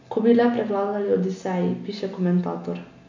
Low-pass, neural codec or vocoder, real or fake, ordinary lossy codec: 7.2 kHz; none; real; MP3, 48 kbps